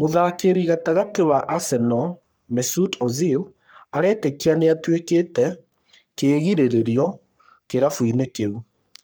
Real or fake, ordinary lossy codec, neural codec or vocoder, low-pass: fake; none; codec, 44.1 kHz, 3.4 kbps, Pupu-Codec; none